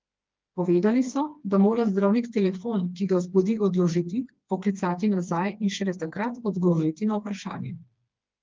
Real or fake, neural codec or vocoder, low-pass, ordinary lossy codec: fake; codec, 16 kHz, 2 kbps, FreqCodec, smaller model; 7.2 kHz; Opus, 32 kbps